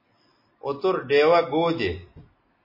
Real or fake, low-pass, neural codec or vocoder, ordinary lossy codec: real; 5.4 kHz; none; MP3, 24 kbps